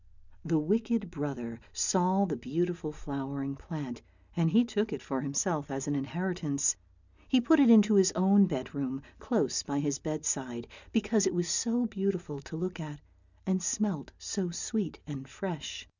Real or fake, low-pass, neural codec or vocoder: real; 7.2 kHz; none